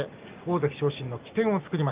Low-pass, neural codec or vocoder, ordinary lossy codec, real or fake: 3.6 kHz; none; Opus, 32 kbps; real